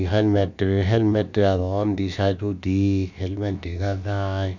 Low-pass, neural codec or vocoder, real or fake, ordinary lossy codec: 7.2 kHz; codec, 16 kHz, about 1 kbps, DyCAST, with the encoder's durations; fake; none